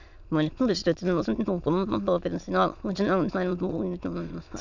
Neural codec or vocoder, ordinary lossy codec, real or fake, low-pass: autoencoder, 22.05 kHz, a latent of 192 numbers a frame, VITS, trained on many speakers; none; fake; 7.2 kHz